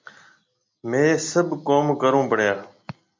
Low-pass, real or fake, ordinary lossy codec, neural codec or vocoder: 7.2 kHz; real; MP3, 64 kbps; none